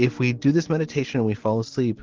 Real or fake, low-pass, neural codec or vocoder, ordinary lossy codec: real; 7.2 kHz; none; Opus, 16 kbps